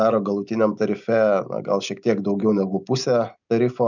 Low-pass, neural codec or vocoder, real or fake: 7.2 kHz; none; real